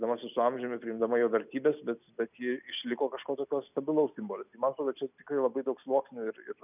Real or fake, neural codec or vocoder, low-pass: real; none; 3.6 kHz